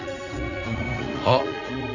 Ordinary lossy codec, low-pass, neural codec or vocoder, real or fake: none; 7.2 kHz; vocoder, 44.1 kHz, 80 mel bands, Vocos; fake